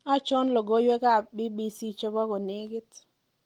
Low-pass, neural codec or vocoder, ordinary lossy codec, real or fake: 19.8 kHz; none; Opus, 24 kbps; real